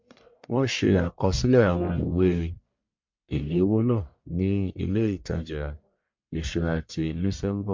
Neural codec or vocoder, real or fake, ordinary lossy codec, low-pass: codec, 44.1 kHz, 1.7 kbps, Pupu-Codec; fake; MP3, 48 kbps; 7.2 kHz